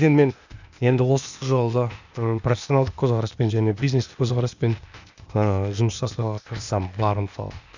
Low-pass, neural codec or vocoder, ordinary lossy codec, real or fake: 7.2 kHz; codec, 16 kHz, 0.8 kbps, ZipCodec; none; fake